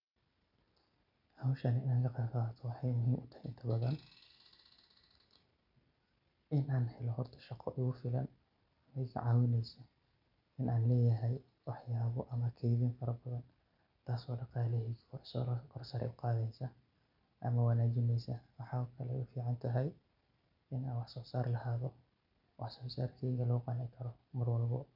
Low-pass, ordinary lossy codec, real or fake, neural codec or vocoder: 5.4 kHz; none; real; none